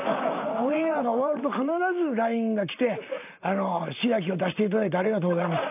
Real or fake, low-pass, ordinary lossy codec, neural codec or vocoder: fake; 3.6 kHz; AAC, 32 kbps; vocoder, 44.1 kHz, 128 mel bands, Pupu-Vocoder